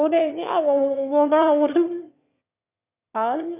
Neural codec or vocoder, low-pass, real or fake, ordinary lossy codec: autoencoder, 22.05 kHz, a latent of 192 numbers a frame, VITS, trained on one speaker; 3.6 kHz; fake; none